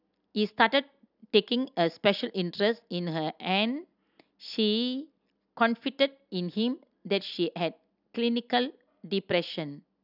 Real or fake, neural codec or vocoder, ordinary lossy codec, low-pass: real; none; none; 5.4 kHz